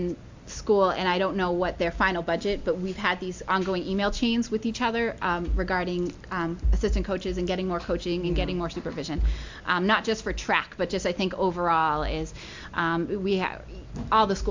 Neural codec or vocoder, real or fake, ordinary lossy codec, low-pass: none; real; MP3, 64 kbps; 7.2 kHz